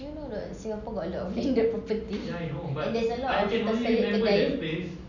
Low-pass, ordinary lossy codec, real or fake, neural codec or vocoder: 7.2 kHz; none; real; none